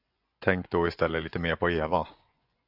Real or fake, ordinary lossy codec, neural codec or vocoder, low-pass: fake; AAC, 48 kbps; vocoder, 24 kHz, 100 mel bands, Vocos; 5.4 kHz